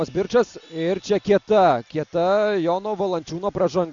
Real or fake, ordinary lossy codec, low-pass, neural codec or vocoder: real; MP3, 64 kbps; 7.2 kHz; none